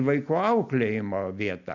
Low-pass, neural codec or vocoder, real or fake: 7.2 kHz; none; real